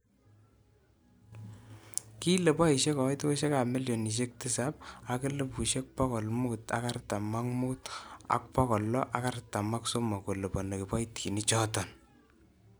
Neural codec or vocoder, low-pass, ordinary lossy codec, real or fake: none; none; none; real